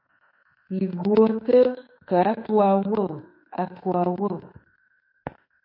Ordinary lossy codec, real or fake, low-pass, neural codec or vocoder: MP3, 32 kbps; fake; 5.4 kHz; autoencoder, 48 kHz, 32 numbers a frame, DAC-VAE, trained on Japanese speech